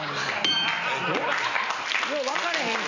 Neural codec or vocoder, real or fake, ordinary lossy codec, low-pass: none; real; none; 7.2 kHz